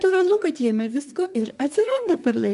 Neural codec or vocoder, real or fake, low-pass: codec, 24 kHz, 1 kbps, SNAC; fake; 10.8 kHz